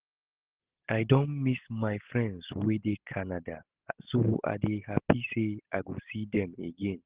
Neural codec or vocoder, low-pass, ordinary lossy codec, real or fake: none; 3.6 kHz; Opus, 16 kbps; real